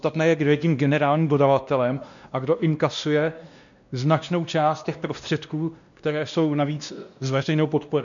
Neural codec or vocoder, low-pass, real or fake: codec, 16 kHz, 1 kbps, X-Codec, WavLM features, trained on Multilingual LibriSpeech; 7.2 kHz; fake